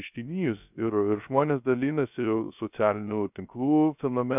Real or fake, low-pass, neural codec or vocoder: fake; 3.6 kHz; codec, 16 kHz, 0.3 kbps, FocalCodec